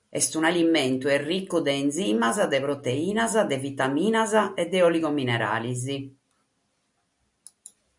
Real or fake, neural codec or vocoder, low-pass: real; none; 10.8 kHz